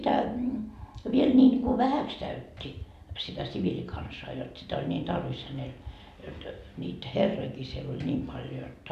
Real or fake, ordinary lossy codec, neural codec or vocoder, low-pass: real; none; none; 14.4 kHz